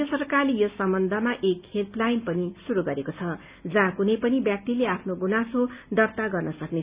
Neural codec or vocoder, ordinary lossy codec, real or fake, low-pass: none; Opus, 64 kbps; real; 3.6 kHz